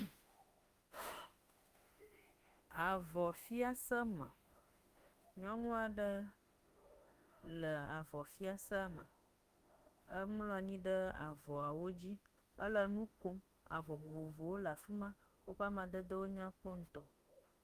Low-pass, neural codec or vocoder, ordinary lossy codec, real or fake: 14.4 kHz; autoencoder, 48 kHz, 32 numbers a frame, DAC-VAE, trained on Japanese speech; Opus, 32 kbps; fake